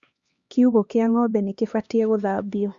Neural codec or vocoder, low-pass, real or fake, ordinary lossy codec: codec, 16 kHz, 2 kbps, X-Codec, HuBERT features, trained on LibriSpeech; 7.2 kHz; fake; Opus, 64 kbps